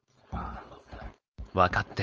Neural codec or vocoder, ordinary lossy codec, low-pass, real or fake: codec, 16 kHz, 4.8 kbps, FACodec; Opus, 24 kbps; 7.2 kHz; fake